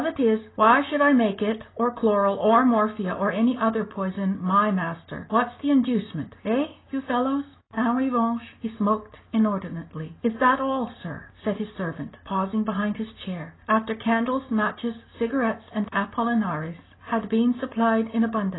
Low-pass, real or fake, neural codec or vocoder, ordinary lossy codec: 7.2 kHz; real; none; AAC, 16 kbps